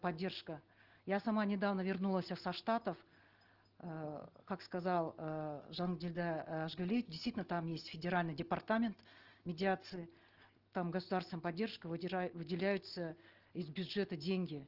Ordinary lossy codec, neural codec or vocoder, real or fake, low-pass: Opus, 16 kbps; none; real; 5.4 kHz